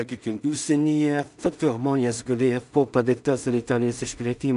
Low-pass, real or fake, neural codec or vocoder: 10.8 kHz; fake; codec, 16 kHz in and 24 kHz out, 0.4 kbps, LongCat-Audio-Codec, two codebook decoder